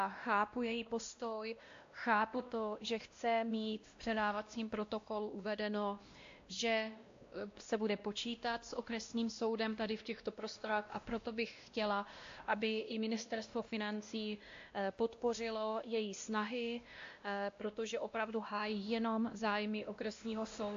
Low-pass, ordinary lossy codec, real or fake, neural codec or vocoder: 7.2 kHz; AAC, 48 kbps; fake; codec, 16 kHz, 1 kbps, X-Codec, WavLM features, trained on Multilingual LibriSpeech